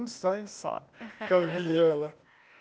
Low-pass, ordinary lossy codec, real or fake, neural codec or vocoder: none; none; fake; codec, 16 kHz, 0.8 kbps, ZipCodec